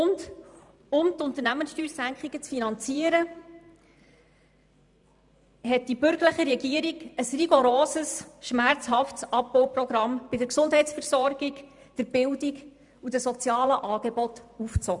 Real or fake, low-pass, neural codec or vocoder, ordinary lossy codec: fake; 10.8 kHz; vocoder, 44.1 kHz, 128 mel bands every 512 samples, BigVGAN v2; none